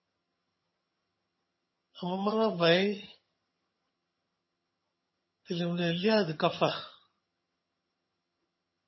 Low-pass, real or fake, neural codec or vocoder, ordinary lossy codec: 7.2 kHz; fake; vocoder, 22.05 kHz, 80 mel bands, HiFi-GAN; MP3, 24 kbps